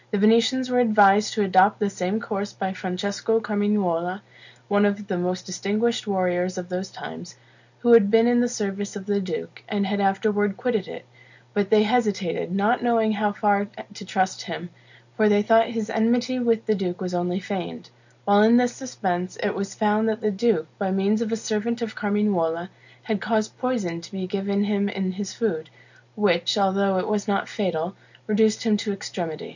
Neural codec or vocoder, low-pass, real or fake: none; 7.2 kHz; real